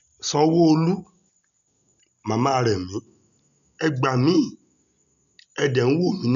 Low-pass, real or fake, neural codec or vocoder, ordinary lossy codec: 7.2 kHz; real; none; none